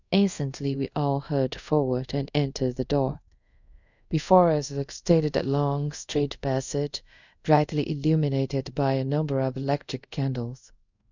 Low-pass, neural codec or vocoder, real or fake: 7.2 kHz; codec, 24 kHz, 0.5 kbps, DualCodec; fake